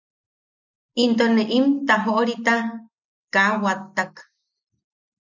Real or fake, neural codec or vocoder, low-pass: real; none; 7.2 kHz